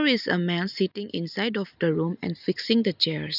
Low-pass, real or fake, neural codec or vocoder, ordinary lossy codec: 5.4 kHz; real; none; none